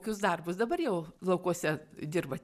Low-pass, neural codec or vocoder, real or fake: 14.4 kHz; none; real